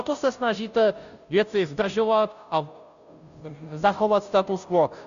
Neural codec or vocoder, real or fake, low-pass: codec, 16 kHz, 0.5 kbps, FunCodec, trained on Chinese and English, 25 frames a second; fake; 7.2 kHz